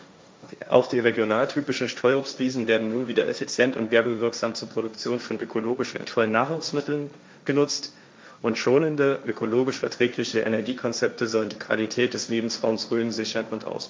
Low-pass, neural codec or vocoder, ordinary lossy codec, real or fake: none; codec, 16 kHz, 1.1 kbps, Voila-Tokenizer; none; fake